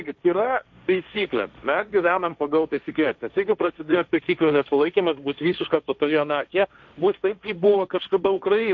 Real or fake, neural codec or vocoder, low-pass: fake; codec, 16 kHz, 1.1 kbps, Voila-Tokenizer; 7.2 kHz